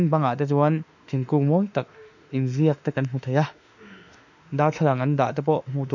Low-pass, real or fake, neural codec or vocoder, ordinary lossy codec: 7.2 kHz; fake; autoencoder, 48 kHz, 32 numbers a frame, DAC-VAE, trained on Japanese speech; none